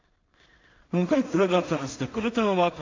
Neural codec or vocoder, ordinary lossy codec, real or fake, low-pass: codec, 16 kHz in and 24 kHz out, 0.4 kbps, LongCat-Audio-Codec, two codebook decoder; MP3, 48 kbps; fake; 7.2 kHz